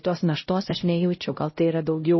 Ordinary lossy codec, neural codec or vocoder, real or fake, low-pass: MP3, 24 kbps; codec, 16 kHz, 0.5 kbps, X-Codec, HuBERT features, trained on LibriSpeech; fake; 7.2 kHz